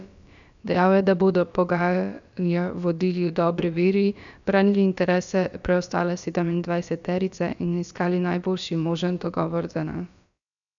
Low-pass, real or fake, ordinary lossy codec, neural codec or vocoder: 7.2 kHz; fake; MP3, 96 kbps; codec, 16 kHz, about 1 kbps, DyCAST, with the encoder's durations